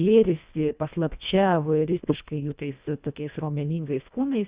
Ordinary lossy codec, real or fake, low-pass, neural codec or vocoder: Opus, 64 kbps; fake; 3.6 kHz; codec, 24 kHz, 1.5 kbps, HILCodec